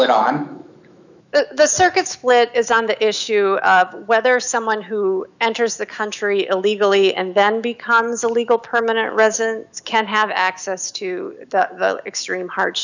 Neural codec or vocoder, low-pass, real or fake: autoencoder, 48 kHz, 128 numbers a frame, DAC-VAE, trained on Japanese speech; 7.2 kHz; fake